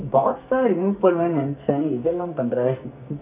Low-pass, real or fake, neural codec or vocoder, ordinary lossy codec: 3.6 kHz; fake; codec, 44.1 kHz, 2.6 kbps, SNAC; none